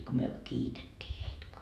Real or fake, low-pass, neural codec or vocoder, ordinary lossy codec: fake; 14.4 kHz; autoencoder, 48 kHz, 128 numbers a frame, DAC-VAE, trained on Japanese speech; AAC, 64 kbps